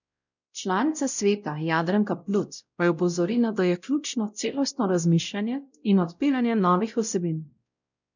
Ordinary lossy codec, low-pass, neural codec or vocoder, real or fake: none; 7.2 kHz; codec, 16 kHz, 0.5 kbps, X-Codec, WavLM features, trained on Multilingual LibriSpeech; fake